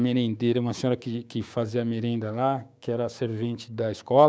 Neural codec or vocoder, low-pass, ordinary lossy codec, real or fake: codec, 16 kHz, 6 kbps, DAC; none; none; fake